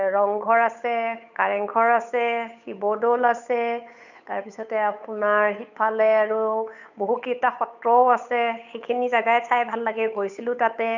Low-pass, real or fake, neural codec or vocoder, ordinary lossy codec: 7.2 kHz; fake; codec, 16 kHz, 8 kbps, FunCodec, trained on Chinese and English, 25 frames a second; none